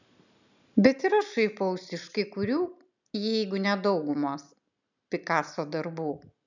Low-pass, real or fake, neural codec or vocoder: 7.2 kHz; real; none